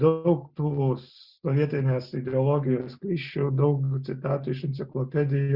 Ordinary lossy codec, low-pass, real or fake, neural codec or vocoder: Opus, 64 kbps; 5.4 kHz; real; none